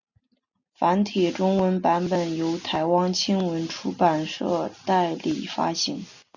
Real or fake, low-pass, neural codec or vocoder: real; 7.2 kHz; none